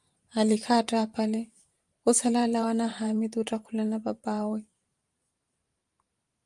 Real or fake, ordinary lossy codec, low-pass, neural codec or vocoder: fake; Opus, 32 kbps; 10.8 kHz; vocoder, 44.1 kHz, 128 mel bands every 512 samples, BigVGAN v2